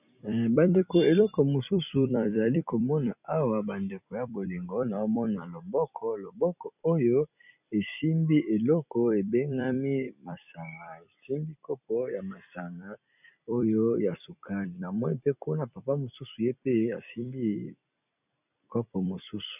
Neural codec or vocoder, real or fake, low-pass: vocoder, 44.1 kHz, 128 mel bands every 256 samples, BigVGAN v2; fake; 3.6 kHz